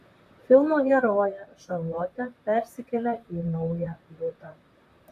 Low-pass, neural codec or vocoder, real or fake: 14.4 kHz; vocoder, 44.1 kHz, 128 mel bands, Pupu-Vocoder; fake